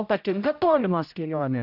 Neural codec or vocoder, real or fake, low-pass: codec, 16 kHz, 0.5 kbps, X-Codec, HuBERT features, trained on general audio; fake; 5.4 kHz